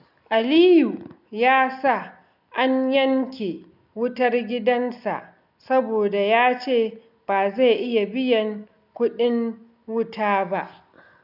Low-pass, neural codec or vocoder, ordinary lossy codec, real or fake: 5.4 kHz; none; none; real